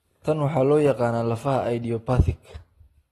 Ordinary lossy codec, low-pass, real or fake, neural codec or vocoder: AAC, 32 kbps; 19.8 kHz; real; none